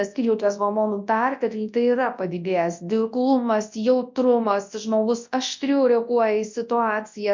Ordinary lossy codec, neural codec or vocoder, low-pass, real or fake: MP3, 48 kbps; codec, 24 kHz, 0.9 kbps, WavTokenizer, large speech release; 7.2 kHz; fake